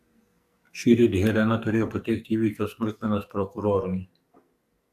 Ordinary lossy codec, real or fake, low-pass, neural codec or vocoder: AAC, 96 kbps; fake; 14.4 kHz; codec, 44.1 kHz, 2.6 kbps, SNAC